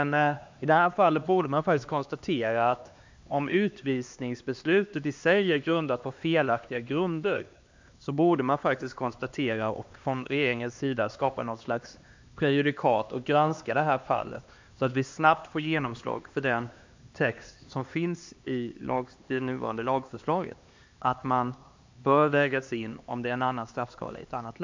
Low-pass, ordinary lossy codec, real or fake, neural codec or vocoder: 7.2 kHz; MP3, 64 kbps; fake; codec, 16 kHz, 2 kbps, X-Codec, HuBERT features, trained on LibriSpeech